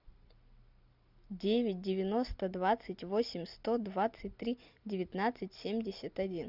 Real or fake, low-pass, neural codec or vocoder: real; 5.4 kHz; none